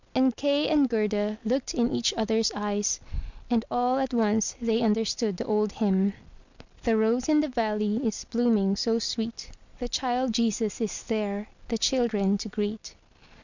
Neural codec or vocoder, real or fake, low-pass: none; real; 7.2 kHz